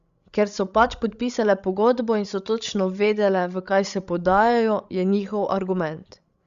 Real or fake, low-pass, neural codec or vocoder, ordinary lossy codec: fake; 7.2 kHz; codec, 16 kHz, 16 kbps, FreqCodec, larger model; Opus, 64 kbps